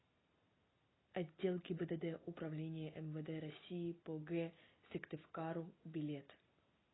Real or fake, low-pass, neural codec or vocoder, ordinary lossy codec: real; 7.2 kHz; none; AAC, 16 kbps